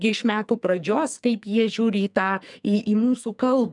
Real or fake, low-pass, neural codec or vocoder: fake; 10.8 kHz; codec, 44.1 kHz, 2.6 kbps, SNAC